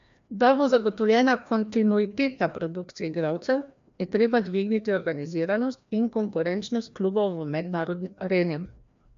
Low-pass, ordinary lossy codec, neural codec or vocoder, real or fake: 7.2 kHz; AAC, 64 kbps; codec, 16 kHz, 1 kbps, FreqCodec, larger model; fake